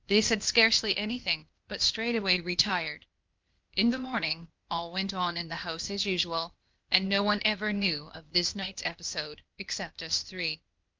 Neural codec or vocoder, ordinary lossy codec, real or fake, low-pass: codec, 16 kHz, 0.8 kbps, ZipCodec; Opus, 24 kbps; fake; 7.2 kHz